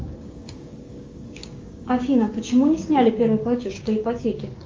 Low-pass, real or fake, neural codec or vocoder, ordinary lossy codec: 7.2 kHz; fake; codec, 16 kHz, 6 kbps, DAC; Opus, 32 kbps